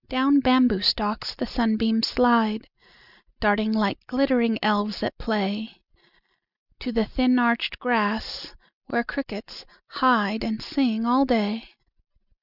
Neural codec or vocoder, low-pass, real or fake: none; 5.4 kHz; real